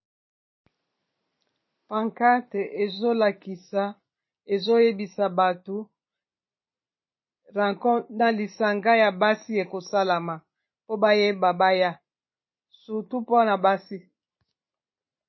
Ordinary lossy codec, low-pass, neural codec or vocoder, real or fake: MP3, 24 kbps; 7.2 kHz; none; real